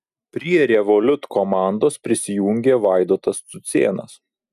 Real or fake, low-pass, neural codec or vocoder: real; 14.4 kHz; none